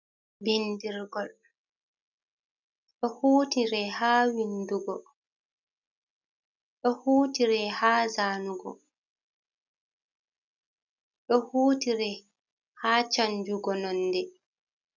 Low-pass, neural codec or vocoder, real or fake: 7.2 kHz; none; real